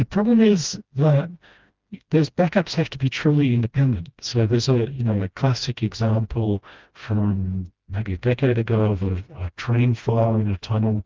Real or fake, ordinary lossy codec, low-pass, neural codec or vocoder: fake; Opus, 24 kbps; 7.2 kHz; codec, 16 kHz, 1 kbps, FreqCodec, smaller model